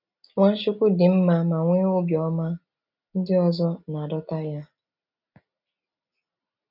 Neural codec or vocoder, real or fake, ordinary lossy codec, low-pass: none; real; none; 5.4 kHz